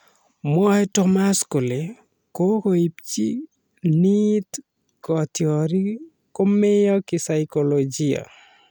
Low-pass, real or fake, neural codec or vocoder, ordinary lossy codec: none; real; none; none